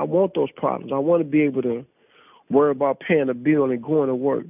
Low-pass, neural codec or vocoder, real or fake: 3.6 kHz; none; real